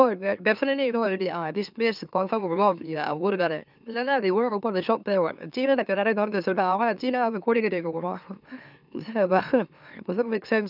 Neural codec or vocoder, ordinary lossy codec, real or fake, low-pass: autoencoder, 44.1 kHz, a latent of 192 numbers a frame, MeloTTS; none; fake; 5.4 kHz